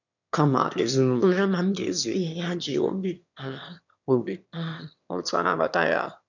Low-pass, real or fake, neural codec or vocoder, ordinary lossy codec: 7.2 kHz; fake; autoencoder, 22.05 kHz, a latent of 192 numbers a frame, VITS, trained on one speaker; none